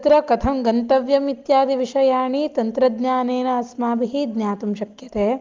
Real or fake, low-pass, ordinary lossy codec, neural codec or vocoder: real; 7.2 kHz; Opus, 32 kbps; none